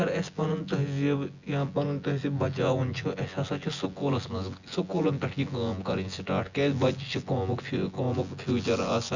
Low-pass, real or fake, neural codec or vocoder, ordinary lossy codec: 7.2 kHz; fake; vocoder, 24 kHz, 100 mel bands, Vocos; Opus, 64 kbps